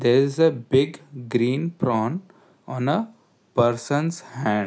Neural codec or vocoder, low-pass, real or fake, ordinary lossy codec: none; none; real; none